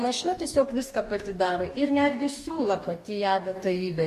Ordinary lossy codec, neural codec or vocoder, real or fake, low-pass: MP3, 64 kbps; codec, 44.1 kHz, 2.6 kbps, DAC; fake; 14.4 kHz